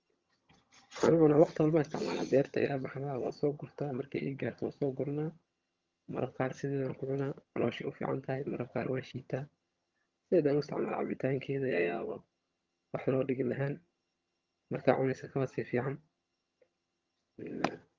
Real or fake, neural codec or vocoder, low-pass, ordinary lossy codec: fake; vocoder, 22.05 kHz, 80 mel bands, HiFi-GAN; 7.2 kHz; Opus, 32 kbps